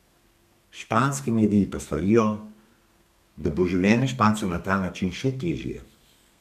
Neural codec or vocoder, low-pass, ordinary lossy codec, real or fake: codec, 32 kHz, 1.9 kbps, SNAC; 14.4 kHz; none; fake